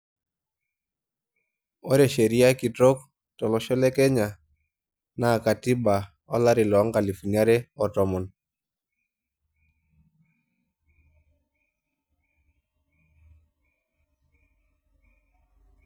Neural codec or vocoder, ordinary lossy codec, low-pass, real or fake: none; none; none; real